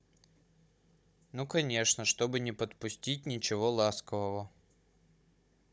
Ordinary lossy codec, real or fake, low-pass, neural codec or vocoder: none; fake; none; codec, 16 kHz, 16 kbps, FunCodec, trained on Chinese and English, 50 frames a second